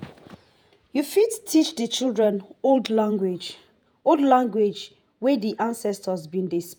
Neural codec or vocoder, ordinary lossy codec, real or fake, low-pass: vocoder, 48 kHz, 128 mel bands, Vocos; none; fake; none